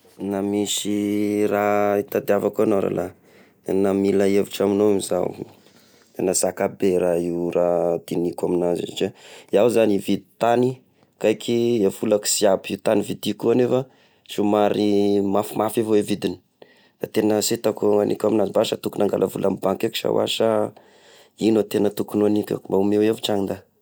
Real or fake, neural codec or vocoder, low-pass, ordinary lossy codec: real; none; none; none